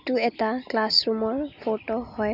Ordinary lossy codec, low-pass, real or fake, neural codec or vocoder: none; 5.4 kHz; real; none